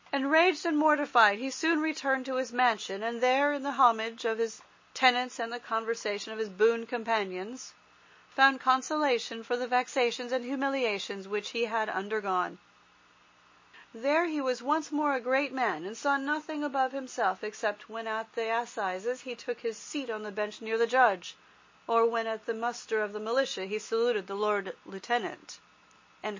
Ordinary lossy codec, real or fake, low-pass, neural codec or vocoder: MP3, 32 kbps; real; 7.2 kHz; none